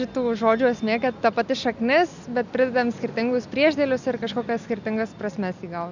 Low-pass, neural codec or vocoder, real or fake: 7.2 kHz; none; real